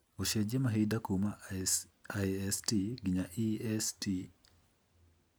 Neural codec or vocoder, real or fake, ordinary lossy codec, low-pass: none; real; none; none